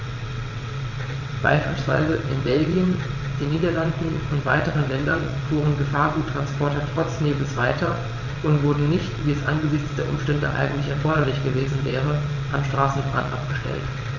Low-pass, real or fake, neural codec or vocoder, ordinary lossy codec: 7.2 kHz; fake; vocoder, 22.05 kHz, 80 mel bands, WaveNeXt; none